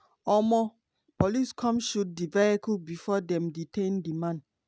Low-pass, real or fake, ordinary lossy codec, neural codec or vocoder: none; real; none; none